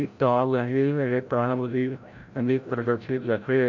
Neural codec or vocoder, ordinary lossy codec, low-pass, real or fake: codec, 16 kHz, 0.5 kbps, FreqCodec, larger model; none; 7.2 kHz; fake